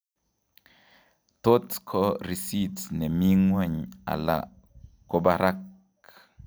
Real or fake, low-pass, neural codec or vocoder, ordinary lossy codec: real; none; none; none